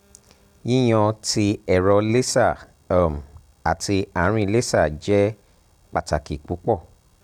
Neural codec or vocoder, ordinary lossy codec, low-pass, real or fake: none; none; 19.8 kHz; real